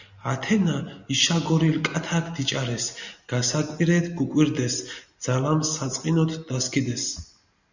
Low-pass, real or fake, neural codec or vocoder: 7.2 kHz; real; none